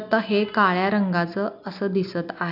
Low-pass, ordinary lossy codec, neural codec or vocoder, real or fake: 5.4 kHz; none; none; real